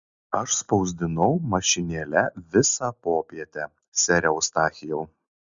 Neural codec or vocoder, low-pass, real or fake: none; 7.2 kHz; real